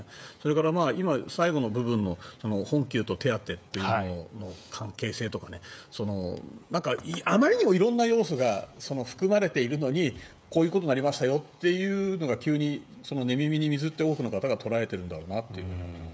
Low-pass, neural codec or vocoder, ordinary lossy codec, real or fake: none; codec, 16 kHz, 16 kbps, FreqCodec, smaller model; none; fake